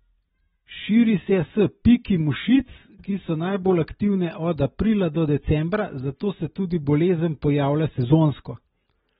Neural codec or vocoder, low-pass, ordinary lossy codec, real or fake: none; 14.4 kHz; AAC, 16 kbps; real